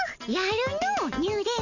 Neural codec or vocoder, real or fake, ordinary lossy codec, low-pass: none; real; none; 7.2 kHz